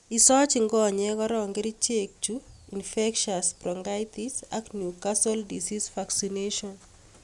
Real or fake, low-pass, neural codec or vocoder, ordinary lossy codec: real; 10.8 kHz; none; none